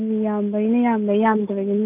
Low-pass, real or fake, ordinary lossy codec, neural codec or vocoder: 3.6 kHz; real; none; none